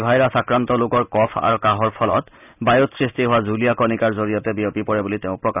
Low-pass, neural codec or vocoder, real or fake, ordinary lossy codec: 3.6 kHz; none; real; none